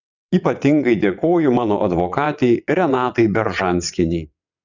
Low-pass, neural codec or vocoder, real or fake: 7.2 kHz; vocoder, 22.05 kHz, 80 mel bands, WaveNeXt; fake